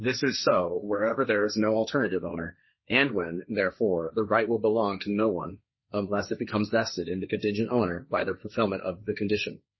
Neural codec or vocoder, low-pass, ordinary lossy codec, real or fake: codec, 16 kHz, 1.1 kbps, Voila-Tokenizer; 7.2 kHz; MP3, 24 kbps; fake